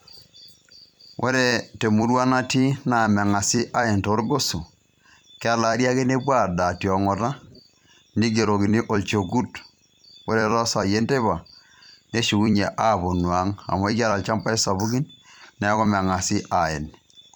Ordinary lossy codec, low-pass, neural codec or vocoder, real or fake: none; 19.8 kHz; vocoder, 44.1 kHz, 128 mel bands every 256 samples, BigVGAN v2; fake